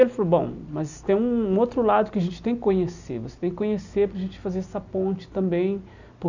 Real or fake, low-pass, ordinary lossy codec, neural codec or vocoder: real; 7.2 kHz; none; none